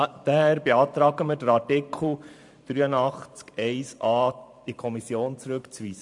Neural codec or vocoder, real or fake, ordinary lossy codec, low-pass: vocoder, 44.1 kHz, 128 mel bands every 512 samples, BigVGAN v2; fake; MP3, 64 kbps; 10.8 kHz